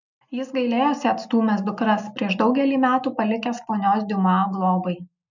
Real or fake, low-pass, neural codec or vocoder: real; 7.2 kHz; none